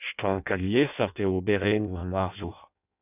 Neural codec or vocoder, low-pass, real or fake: codec, 16 kHz in and 24 kHz out, 0.6 kbps, FireRedTTS-2 codec; 3.6 kHz; fake